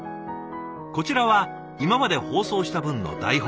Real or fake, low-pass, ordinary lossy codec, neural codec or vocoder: real; none; none; none